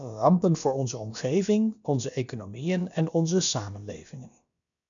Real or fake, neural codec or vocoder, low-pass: fake; codec, 16 kHz, about 1 kbps, DyCAST, with the encoder's durations; 7.2 kHz